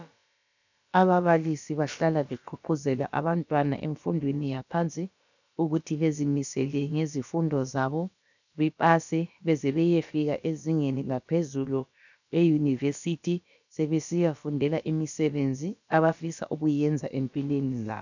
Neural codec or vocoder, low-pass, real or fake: codec, 16 kHz, about 1 kbps, DyCAST, with the encoder's durations; 7.2 kHz; fake